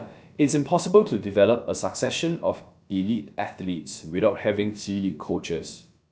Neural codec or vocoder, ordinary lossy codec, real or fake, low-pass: codec, 16 kHz, about 1 kbps, DyCAST, with the encoder's durations; none; fake; none